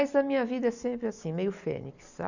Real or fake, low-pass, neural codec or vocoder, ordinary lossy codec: real; 7.2 kHz; none; none